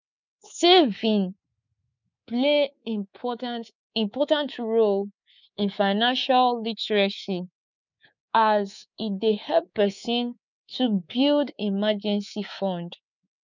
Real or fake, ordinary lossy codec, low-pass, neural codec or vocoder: fake; none; 7.2 kHz; codec, 24 kHz, 3.1 kbps, DualCodec